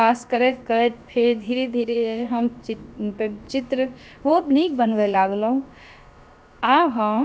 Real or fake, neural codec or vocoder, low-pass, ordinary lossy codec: fake; codec, 16 kHz, about 1 kbps, DyCAST, with the encoder's durations; none; none